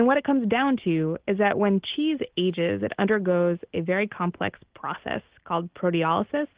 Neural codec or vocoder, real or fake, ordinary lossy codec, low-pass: none; real; Opus, 16 kbps; 3.6 kHz